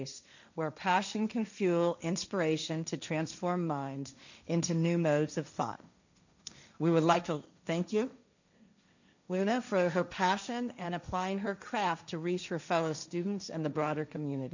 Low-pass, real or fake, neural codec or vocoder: 7.2 kHz; fake; codec, 16 kHz, 1.1 kbps, Voila-Tokenizer